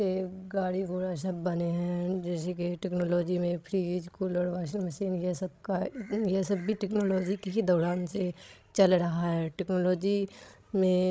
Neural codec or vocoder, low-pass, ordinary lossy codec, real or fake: codec, 16 kHz, 16 kbps, FreqCodec, larger model; none; none; fake